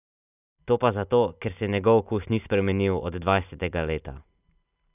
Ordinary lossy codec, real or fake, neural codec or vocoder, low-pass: none; real; none; 3.6 kHz